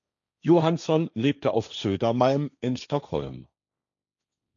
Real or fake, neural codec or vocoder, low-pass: fake; codec, 16 kHz, 1.1 kbps, Voila-Tokenizer; 7.2 kHz